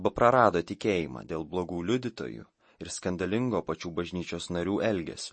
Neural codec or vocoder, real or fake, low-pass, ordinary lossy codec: none; real; 9.9 kHz; MP3, 32 kbps